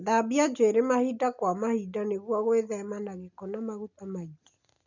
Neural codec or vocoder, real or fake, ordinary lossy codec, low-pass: none; real; none; 7.2 kHz